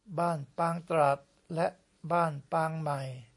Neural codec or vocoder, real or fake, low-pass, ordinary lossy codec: none; real; 10.8 kHz; MP3, 48 kbps